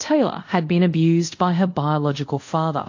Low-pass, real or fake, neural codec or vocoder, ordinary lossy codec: 7.2 kHz; fake; codec, 24 kHz, 0.5 kbps, DualCodec; AAC, 48 kbps